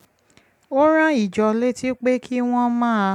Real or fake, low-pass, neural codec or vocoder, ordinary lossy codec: real; 19.8 kHz; none; none